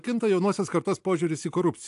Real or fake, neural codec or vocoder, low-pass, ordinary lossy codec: real; none; 10.8 kHz; AAC, 64 kbps